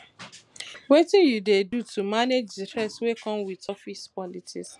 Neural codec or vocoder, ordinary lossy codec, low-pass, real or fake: none; none; none; real